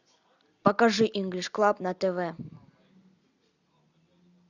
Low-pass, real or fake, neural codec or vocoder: 7.2 kHz; real; none